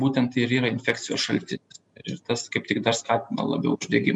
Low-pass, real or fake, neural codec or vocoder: 10.8 kHz; real; none